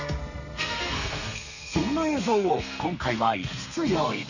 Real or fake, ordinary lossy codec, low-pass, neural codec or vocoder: fake; none; 7.2 kHz; codec, 32 kHz, 1.9 kbps, SNAC